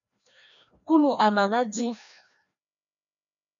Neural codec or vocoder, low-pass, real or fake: codec, 16 kHz, 1 kbps, FreqCodec, larger model; 7.2 kHz; fake